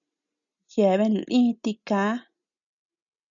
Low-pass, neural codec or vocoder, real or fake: 7.2 kHz; none; real